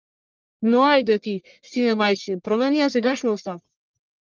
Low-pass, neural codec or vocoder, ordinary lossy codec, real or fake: 7.2 kHz; codec, 44.1 kHz, 1.7 kbps, Pupu-Codec; Opus, 32 kbps; fake